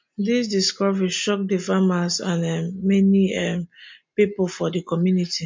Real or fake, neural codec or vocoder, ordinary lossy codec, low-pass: real; none; MP3, 48 kbps; 7.2 kHz